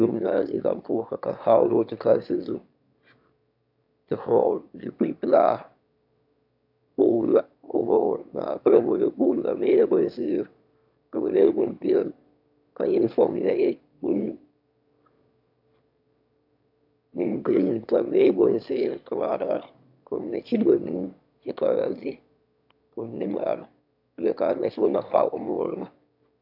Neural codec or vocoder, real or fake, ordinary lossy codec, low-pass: autoencoder, 22.05 kHz, a latent of 192 numbers a frame, VITS, trained on one speaker; fake; AAC, 48 kbps; 5.4 kHz